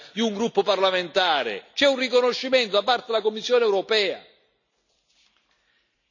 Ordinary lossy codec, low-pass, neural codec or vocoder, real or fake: none; 7.2 kHz; none; real